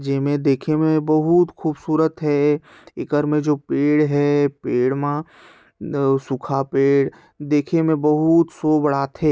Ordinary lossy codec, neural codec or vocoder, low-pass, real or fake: none; none; none; real